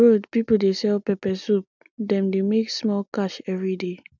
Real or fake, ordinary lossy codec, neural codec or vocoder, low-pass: real; none; none; 7.2 kHz